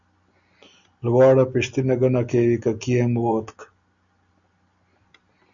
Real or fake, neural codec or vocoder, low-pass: real; none; 7.2 kHz